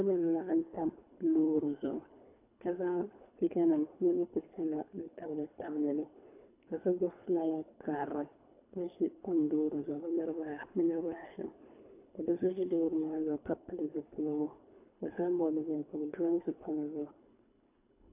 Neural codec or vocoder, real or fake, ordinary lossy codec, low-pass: codec, 24 kHz, 3 kbps, HILCodec; fake; AAC, 24 kbps; 3.6 kHz